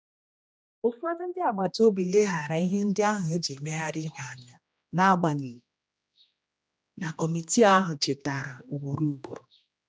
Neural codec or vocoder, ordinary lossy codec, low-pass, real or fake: codec, 16 kHz, 1 kbps, X-Codec, HuBERT features, trained on general audio; none; none; fake